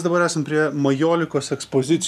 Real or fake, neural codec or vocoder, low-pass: real; none; 14.4 kHz